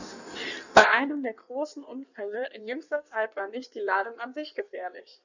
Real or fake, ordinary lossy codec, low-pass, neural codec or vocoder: fake; none; 7.2 kHz; codec, 16 kHz in and 24 kHz out, 1.1 kbps, FireRedTTS-2 codec